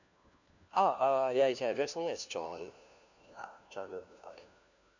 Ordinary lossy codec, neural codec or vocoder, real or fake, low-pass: none; codec, 16 kHz, 1 kbps, FunCodec, trained on LibriTTS, 50 frames a second; fake; 7.2 kHz